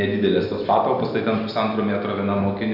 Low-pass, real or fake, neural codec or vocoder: 5.4 kHz; real; none